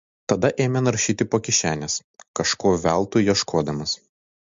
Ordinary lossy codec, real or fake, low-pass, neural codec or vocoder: MP3, 48 kbps; real; 7.2 kHz; none